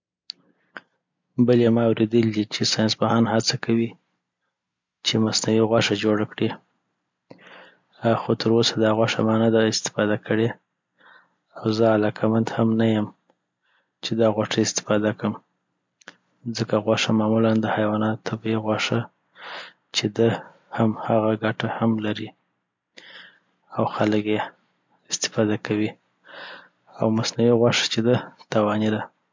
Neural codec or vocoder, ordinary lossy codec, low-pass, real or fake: none; MP3, 64 kbps; 7.2 kHz; real